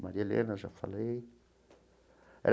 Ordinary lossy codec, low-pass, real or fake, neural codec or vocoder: none; none; real; none